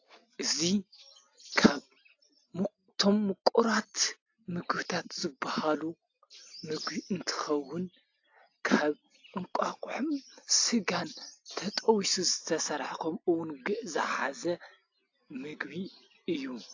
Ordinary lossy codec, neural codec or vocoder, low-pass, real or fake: AAC, 48 kbps; none; 7.2 kHz; real